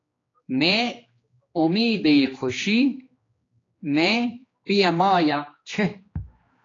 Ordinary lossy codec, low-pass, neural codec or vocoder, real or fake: AAC, 32 kbps; 7.2 kHz; codec, 16 kHz, 2 kbps, X-Codec, HuBERT features, trained on general audio; fake